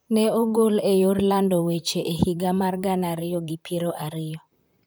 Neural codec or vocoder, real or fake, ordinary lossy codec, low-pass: vocoder, 44.1 kHz, 128 mel bands, Pupu-Vocoder; fake; none; none